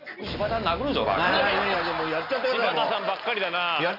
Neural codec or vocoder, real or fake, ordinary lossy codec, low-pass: none; real; none; 5.4 kHz